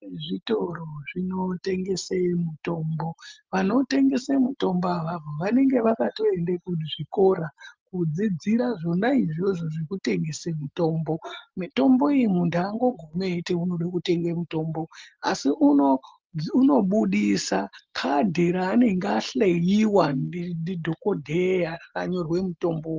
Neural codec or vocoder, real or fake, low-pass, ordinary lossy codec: none; real; 7.2 kHz; Opus, 32 kbps